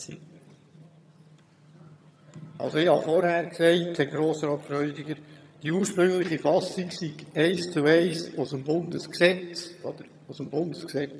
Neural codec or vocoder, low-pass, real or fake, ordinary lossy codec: vocoder, 22.05 kHz, 80 mel bands, HiFi-GAN; none; fake; none